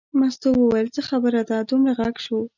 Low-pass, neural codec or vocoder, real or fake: 7.2 kHz; none; real